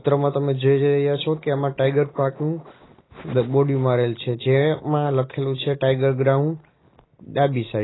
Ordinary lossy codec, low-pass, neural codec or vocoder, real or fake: AAC, 16 kbps; 7.2 kHz; none; real